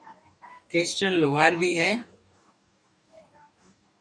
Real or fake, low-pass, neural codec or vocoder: fake; 9.9 kHz; codec, 44.1 kHz, 2.6 kbps, DAC